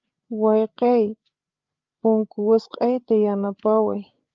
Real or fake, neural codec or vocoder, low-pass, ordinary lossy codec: fake; codec, 16 kHz, 8 kbps, FreqCodec, larger model; 7.2 kHz; Opus, 24 kbps